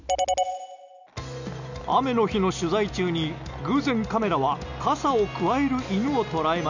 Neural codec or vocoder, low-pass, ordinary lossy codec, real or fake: none; 7.2 kHz; none; real